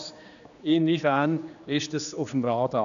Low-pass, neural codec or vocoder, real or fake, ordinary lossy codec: 7.2 kHz; codec, 16 kHz, 2 kbps, X-Codec, HuBERT features, trained on general audio; fake; none